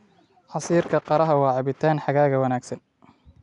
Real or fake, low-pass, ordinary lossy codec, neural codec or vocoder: real; 10.8 kHz; none; none